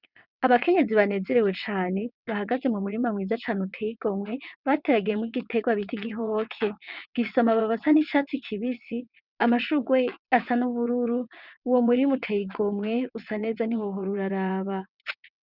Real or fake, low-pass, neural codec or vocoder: fake; 5.4 kHz; vocoder, 22.05 kHz, 80 mel bands, WaveNeXt